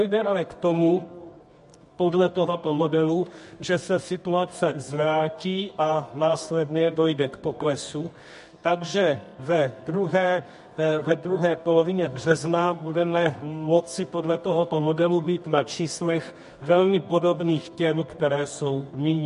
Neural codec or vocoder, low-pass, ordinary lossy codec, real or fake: codec, 24 kHz, 0.9 kbps, WavTokenizer, medium music audio release; 10.8 kHz; MP3, 48 kbps; fake